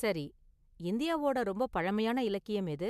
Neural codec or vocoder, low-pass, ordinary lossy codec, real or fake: none; 14.4 kHz; MP3, 96 kbps; real